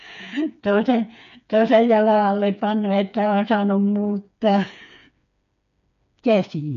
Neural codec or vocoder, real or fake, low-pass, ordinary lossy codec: codec, 16 kHz, 4 kbps, FreqCodec, smaller model; fake; 7.2 kHz; MP3, 96 kbps